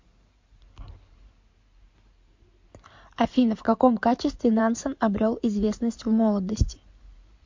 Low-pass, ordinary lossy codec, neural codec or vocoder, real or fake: 7.2 kHz; MP3, 48 kbps; codec, 16 kHz in and 24 kHz out, 2.2 kbps, FireRedTTS-2 codec; fake